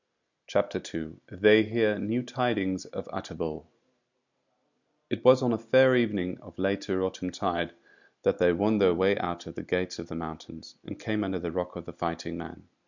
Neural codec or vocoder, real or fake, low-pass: none; real; 7.2 kHz